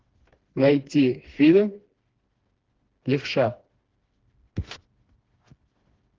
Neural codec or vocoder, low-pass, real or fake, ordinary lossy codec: codec, 16 kHz, 2 kbps, FreqCodec, smaller model; 7.2 kHz; fake; Opus, 16 kbps